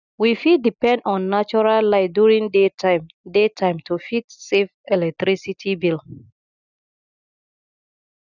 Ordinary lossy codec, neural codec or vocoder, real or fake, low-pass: none; none; real; 7.2 kHz